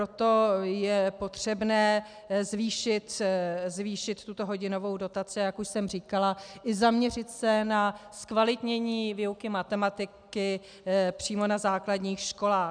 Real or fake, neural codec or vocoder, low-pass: real; none; 9.9 kHz